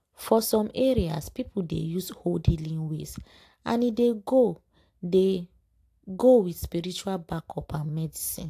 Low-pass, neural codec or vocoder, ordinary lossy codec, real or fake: 14.4 kHz; none; AAC, 64 kbps; real